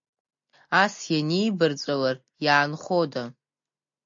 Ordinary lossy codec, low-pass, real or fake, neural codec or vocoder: MP3, 48 kbps; 7.2 kHz; real; none